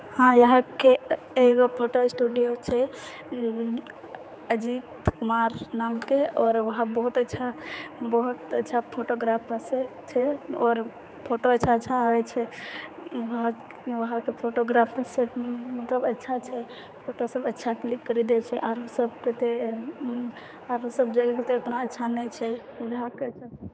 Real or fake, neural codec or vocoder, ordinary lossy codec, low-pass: fake; codec, 16 kHz, 4 kbps, X-Codec, HuBERT features, trained on general audio; none; none